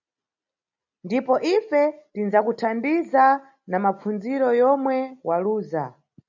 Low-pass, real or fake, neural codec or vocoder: 7.2 kHz; real; none